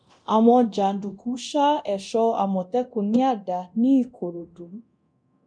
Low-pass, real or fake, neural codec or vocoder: 9.9 kHz; fake; codec, 24 kHz, 0.9 kbps, DualCodec